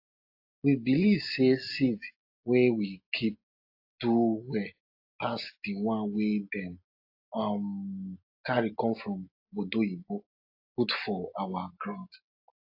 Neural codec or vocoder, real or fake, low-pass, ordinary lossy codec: none; real; 5.4 kHz; AAC, 32 kbps